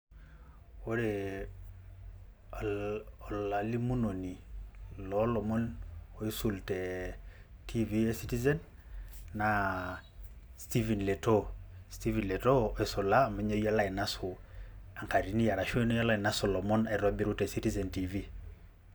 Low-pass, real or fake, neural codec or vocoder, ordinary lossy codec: none; real; none; none